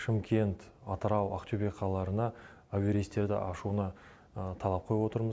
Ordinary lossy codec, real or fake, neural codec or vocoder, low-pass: none; real; none; none